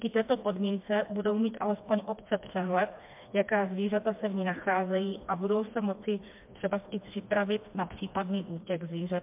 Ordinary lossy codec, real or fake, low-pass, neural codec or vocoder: MP3, 32 kbps; fake; 3.6 kHz; codec, 16 kHz, 2 kbps, FreqCodec, smaller model